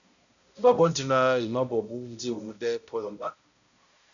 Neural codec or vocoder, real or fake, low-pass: codec, 16 kHz, 0.5 kbps, X-Codec, HuBERT features, trained on balanced general audio; fake; 7.2 kHz